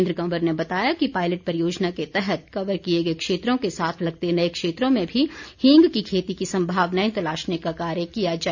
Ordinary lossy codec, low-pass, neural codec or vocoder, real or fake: none; 7.2 kHz; none; real